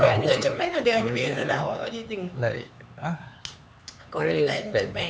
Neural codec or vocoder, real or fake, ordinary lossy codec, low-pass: codec, 16 kHz, 4 kbps, X-Codec, HuBERT features, trained on LibriSpeech; fake; none; none